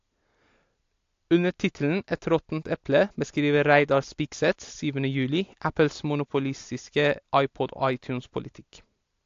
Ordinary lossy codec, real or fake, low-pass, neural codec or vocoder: AAC, 48 kbps; real; 7.2 kHz; none